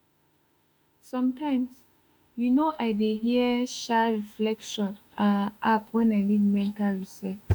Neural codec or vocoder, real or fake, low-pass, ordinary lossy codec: autoencoder, 48 kHz, 32 numbers a frame, DAC-VAE, trained on Japanese speech; fake; none; none